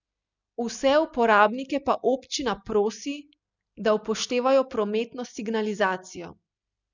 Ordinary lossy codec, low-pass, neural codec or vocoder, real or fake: none; 7.2 kHz; none; real